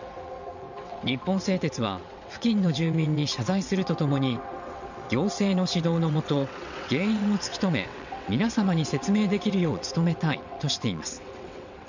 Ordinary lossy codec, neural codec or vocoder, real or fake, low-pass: none; vocoder, 22.05 kHz, 80 mel bands, WaveNeXt; fake; 7.2 kHz